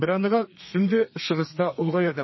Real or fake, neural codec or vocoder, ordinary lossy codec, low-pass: fake; codec, 32 kHz, 1.9 kbps, SNAC; MP3, 24 kbps; 7.2 kHz